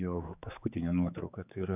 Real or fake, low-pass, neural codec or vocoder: fake; 3.6 kHz; codec, 16 kHz, 4 kbps, X-Codec, HuBERT features, trained on general audio